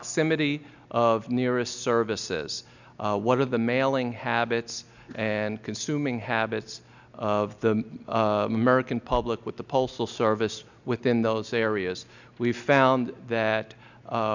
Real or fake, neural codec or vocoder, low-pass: real; none; 7.2 kHz